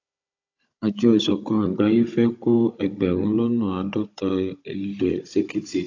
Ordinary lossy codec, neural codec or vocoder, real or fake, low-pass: AAC, 48 kbps; codec, 16 kHz, 16 kbps, FunCodec, trained on Chinese and English, 50 frames a second; fake; 7.2 kHz